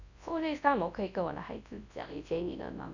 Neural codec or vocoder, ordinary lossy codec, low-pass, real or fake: codec, 24 kHz, 0.9 kbps, WavTokenizer, large speech release; none; 7.2 kHz; fake